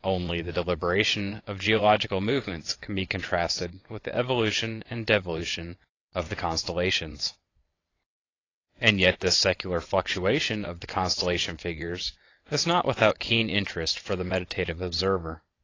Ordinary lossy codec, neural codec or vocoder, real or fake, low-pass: AAC, 32 kbps; vocoder, 22.05 kHz, 80 mel bands, WaveNeXt; fake; 7.2 kHz